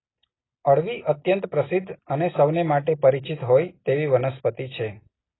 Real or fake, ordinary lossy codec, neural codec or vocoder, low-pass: real; AAC, 16 kbps; none; 7.2 kHz